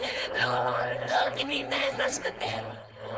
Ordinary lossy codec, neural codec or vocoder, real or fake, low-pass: none; codec, 16 kHz, 4.8 kbps, FACodec; fake; none